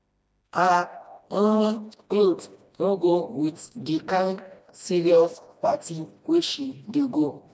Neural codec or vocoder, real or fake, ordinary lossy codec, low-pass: codec, 16 kHz, 1 kbps, FreqCodec, smaller model; fake; none; none